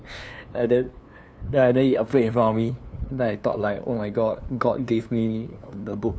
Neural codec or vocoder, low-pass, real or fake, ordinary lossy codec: codec, 16 kHz, 2 kbps, FunCodec, trained on LibriTTS, 25 frames a second; none; fake; none